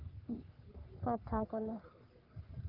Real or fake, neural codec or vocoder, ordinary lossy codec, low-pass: fake; codec, 44.1 kHz, 3.4 kbps, Pupu-Codec; Opus, 32 kbps; 5.4 kHz